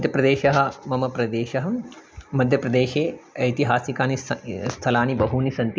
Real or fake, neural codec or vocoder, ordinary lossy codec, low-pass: real; none; none; none